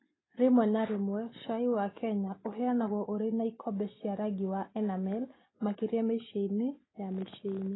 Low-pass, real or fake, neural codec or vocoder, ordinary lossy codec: 7.2 kHz; real; none; AAC, 16 kbps